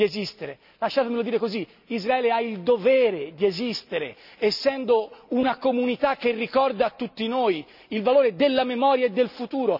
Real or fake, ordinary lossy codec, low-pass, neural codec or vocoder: real; none; 5.4 kHz; none